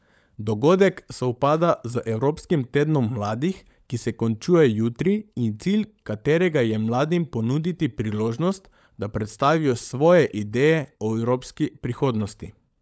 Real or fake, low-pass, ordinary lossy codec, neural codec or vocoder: fake; none; none; codec, 16 kHz, 16 kbps, FunCodec, trained on LibriTTS, 50 frames a second